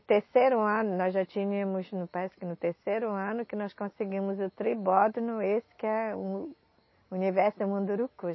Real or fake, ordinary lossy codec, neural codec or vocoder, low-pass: real; MP3, 24 kbps; none; 7.2 kHz